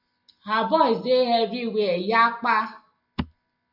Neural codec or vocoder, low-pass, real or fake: none; 5.4 kHz; real